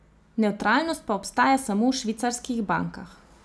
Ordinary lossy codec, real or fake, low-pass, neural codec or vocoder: none; real; none; none